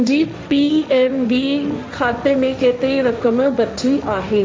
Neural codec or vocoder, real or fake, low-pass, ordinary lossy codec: codec, 16 kHz, 1.1 kbps, Voila-Tokenizer; fake; none; none